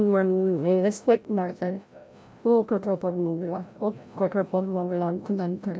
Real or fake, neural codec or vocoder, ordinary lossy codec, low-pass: fake; codec, 16 kHz, 0.5 kbps, FreqCodec, larger model; none; none